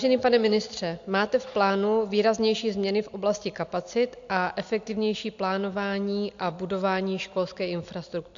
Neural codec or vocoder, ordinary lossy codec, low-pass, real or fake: none; AAC, 64 kbps; 7.2 kHz; real